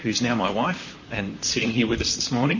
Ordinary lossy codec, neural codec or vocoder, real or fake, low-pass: MP3, 32 kbps; vocoder, 44.1 kHz, 128 mel bands, Pupu-Vocoder; fake; 7.2 kHz